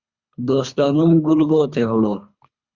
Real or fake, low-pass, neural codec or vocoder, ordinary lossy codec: fake; 7.2 kHz; codec, 24 kHz, 3 kbps, HILCodec; Opus, 64 kbps